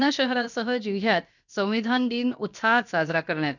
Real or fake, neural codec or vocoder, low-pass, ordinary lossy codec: fake; codec, 16 kHz, about 1 kbps, DyCAST, with the encoder's durations; 7.2 kHz; none